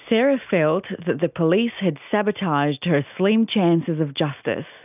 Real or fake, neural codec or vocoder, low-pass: real; none; 3.6 kHz